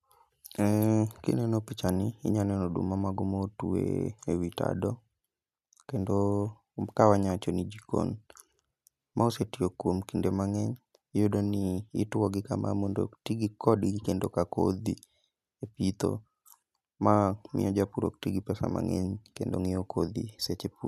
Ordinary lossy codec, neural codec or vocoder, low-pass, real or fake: none; none; 14.4 kHz; real